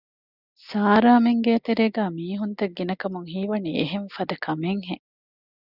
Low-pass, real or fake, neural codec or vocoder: 5.4 kHz; real; none